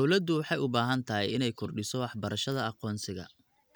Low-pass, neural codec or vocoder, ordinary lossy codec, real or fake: none; none; none; real